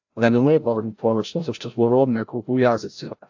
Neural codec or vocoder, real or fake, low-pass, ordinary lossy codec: codec, 16 kHz, 0.5 kbps, FreqCodec, larger model; fake; 7.2 kHz; AAC, 48 kbps